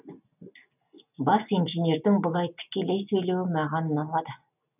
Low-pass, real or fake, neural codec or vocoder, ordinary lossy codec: 3.6 kHz; real; none; none